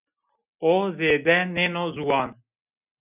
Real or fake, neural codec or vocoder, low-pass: real; none; 3.6 kHz